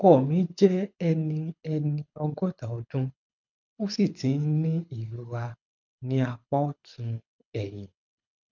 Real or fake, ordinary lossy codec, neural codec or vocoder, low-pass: fake; MP3, 64 kbps; codec, 24 kHz, 6 kbps, HILCodec; 7.2 kHz